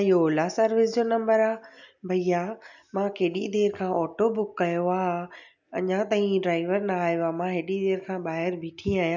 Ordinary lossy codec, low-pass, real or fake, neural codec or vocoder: none; 7.2 kHz; real; none